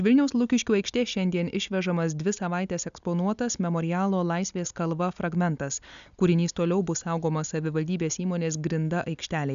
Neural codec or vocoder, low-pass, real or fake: none; 7.2 kHz; real